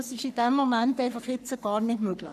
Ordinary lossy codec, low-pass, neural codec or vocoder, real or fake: none; 14.4 kHz; codec, 44.1 kHz, 3.4 kbps, Pupu-Codec; fake